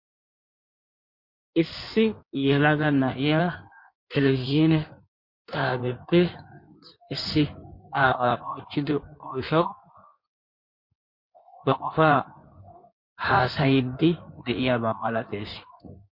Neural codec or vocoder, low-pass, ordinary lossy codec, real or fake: codec, 16 kHz in and 24 kHz out, 1.1 kbps, FireRedTTS-2 codec; 5.4 kHz; MP3, 32 kbps; fake